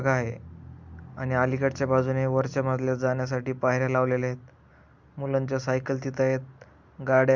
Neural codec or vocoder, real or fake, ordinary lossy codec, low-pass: none; real; none; 7.2 kHz